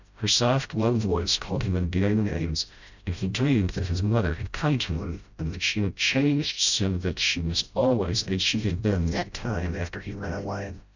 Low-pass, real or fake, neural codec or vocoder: 7.2 kHz; fake; codec, 16 kHz, 0.5 kbps, FreqCodec, smaller model